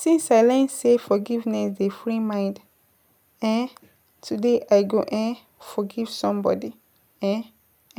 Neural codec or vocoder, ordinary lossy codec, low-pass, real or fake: none; none; none; real